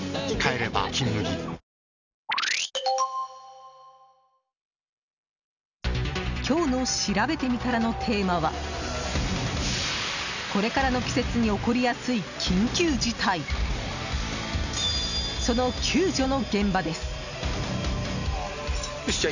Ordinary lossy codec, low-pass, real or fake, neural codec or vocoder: none; 7.2 kHz; real; none